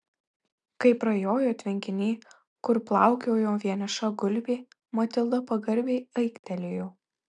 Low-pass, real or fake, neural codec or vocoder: 9.9 kHz; real; none